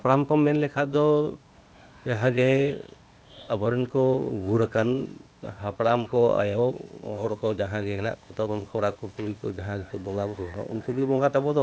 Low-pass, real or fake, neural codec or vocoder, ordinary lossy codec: none; fake; codec, 16 kHz, 0.8 kbps, ZipCodec; none